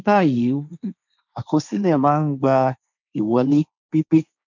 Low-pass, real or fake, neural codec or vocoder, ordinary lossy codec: 7.2 kHz; fake; codec, 16 kHz, 1.1 kbps, Voila-Tokenizer; none